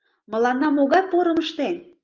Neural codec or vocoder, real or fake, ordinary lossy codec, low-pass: none; real; Opus, 24 kbps; 7.2 kHz